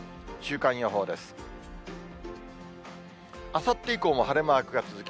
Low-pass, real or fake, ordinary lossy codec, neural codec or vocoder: none; real; none; none